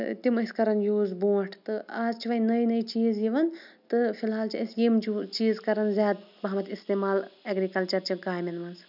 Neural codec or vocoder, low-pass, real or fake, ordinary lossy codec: none; 5.4 kHz; real; none